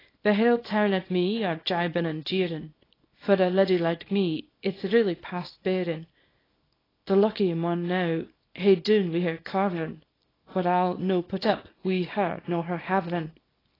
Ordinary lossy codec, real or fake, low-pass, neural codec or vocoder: AAC, 24 kbps; fake; 5.4 kHz; codec, 24 kHz, 0.9 kbps, WavTokenizer, small release